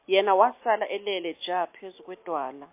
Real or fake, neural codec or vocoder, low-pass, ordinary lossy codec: real; none; 3.6 kHz; MP3, 24 kbps